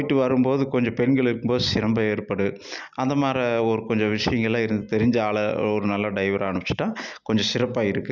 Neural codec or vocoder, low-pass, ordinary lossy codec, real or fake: none; 7.2 kHz; none; real